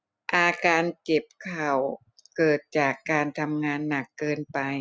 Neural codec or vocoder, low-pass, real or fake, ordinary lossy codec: none; none; real; none